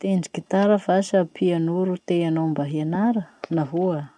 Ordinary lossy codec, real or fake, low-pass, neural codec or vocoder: none; real; 9.9 kHz; none